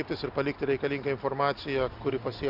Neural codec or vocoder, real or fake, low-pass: none; real; 5.4 kHz